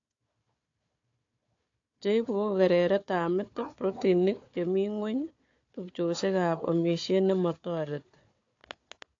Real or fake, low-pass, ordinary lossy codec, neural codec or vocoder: fake; 7.2 kHz; AAC, 48 kbps; codec, 16 kHz, 4 kbps, FunCodec, trained on Chinese and English, 50 frames a second